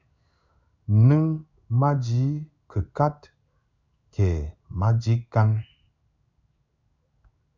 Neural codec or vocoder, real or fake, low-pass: codec, 16 kHz in and 24 kHz out, 1 kbps, XY-Tokenizer; fake; 7.2 kHz